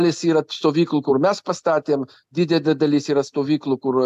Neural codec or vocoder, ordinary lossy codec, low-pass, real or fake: none; AAC, 96 kbps; 14.4 kHz; real